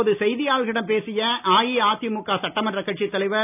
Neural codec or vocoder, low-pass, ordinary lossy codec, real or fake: none; 3.6 kHz; none; real